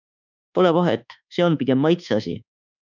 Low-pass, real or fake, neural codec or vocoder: 7.2 kHz; fake; codec, 24 kHz, 1.2 kbps, DualCodec